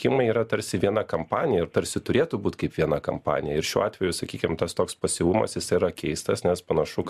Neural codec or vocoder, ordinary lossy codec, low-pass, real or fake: none; MP3, 96 kbps; 14.4 kHz; real